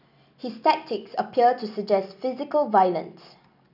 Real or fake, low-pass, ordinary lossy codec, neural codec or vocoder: real; 5.4 kHz; none; none